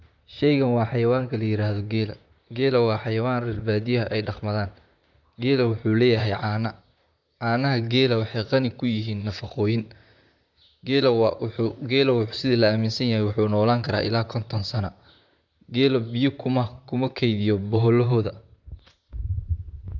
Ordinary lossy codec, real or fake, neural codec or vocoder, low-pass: none; fake; vocoder, 44.1 kHz, 128 mel bands, Pupu-Vocoder; 7.2 kHz